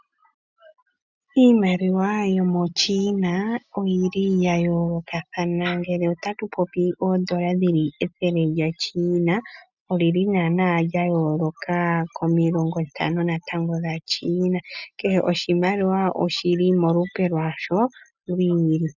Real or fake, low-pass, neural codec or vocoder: real; 7.2 kHz; none